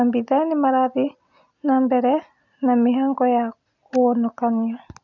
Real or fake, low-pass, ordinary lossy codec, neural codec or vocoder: real; 7.2 kHz; none; none